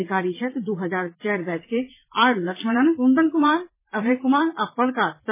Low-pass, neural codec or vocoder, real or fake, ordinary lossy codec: 3.6 kHz; vocoder, 44.1 kHz, 80 mel bands, Vocos; fake; MP3, 16 kbps